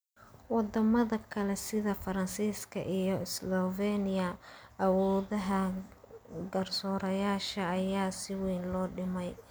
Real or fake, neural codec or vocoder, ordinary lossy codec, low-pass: real; none; none; none